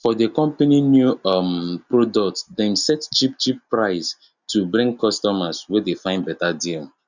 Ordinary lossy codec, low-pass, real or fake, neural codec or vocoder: none; 7.2 kHz; real; none